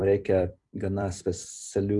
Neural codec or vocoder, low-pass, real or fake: vocoder, 44.1 kHz, 128 mel bands every 256 samples, BigVGAN v2; 10.8 kHz; fake